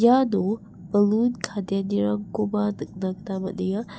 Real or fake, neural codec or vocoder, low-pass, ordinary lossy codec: real; none; none; none